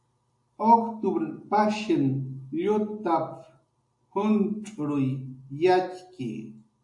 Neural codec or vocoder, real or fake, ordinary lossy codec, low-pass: none; real; AAC, 64 kbps; 10.8 kHz